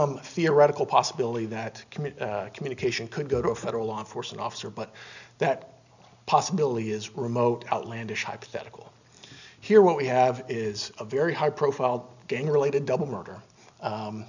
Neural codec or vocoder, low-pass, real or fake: none; 7.2 kHz; real